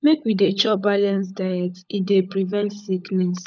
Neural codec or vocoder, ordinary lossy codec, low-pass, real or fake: codec, 16 kHz, 16 kbps, FunCodec, trained on LibriTTS, 50 frames a second; none; none; fake